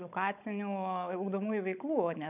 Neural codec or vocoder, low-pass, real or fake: codec, 16 kHz, 16 kbps, FunCodec, trained on Chinese and English, 50 frames a second; 3.6 kHz; fake